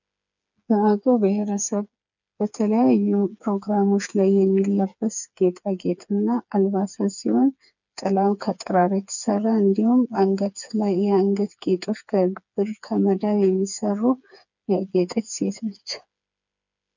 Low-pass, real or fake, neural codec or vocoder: 7.2 kHz; fake; codec, 16 kHz, 4 kbps, FreqCodec, smaller model